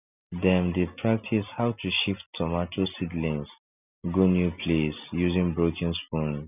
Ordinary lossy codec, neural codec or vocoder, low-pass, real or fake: none; none; 3.6 kHz; real